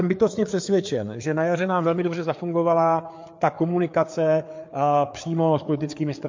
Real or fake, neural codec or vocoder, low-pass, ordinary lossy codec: fake; codec, 16 kHz, 4 kbps, FreqCodec, larger model; 7.2 kHz; MP3, 48 kbps